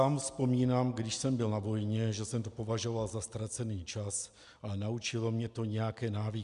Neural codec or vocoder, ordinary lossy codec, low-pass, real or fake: none; MP3, 96 kbps; 10.8 kHz; real